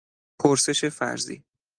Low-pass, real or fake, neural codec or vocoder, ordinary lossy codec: 9.9 kHz; real; none; Opus, 24 kbps